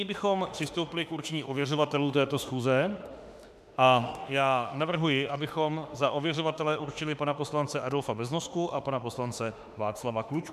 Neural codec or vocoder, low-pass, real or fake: autoencoder, 48 kHz, 32 numbers a frame, DAC-VAE, trained on Japanese speech; 14.4 kHz; fake